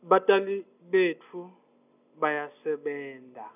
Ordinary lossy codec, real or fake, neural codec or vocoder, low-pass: none; real; none; 3.6 kHz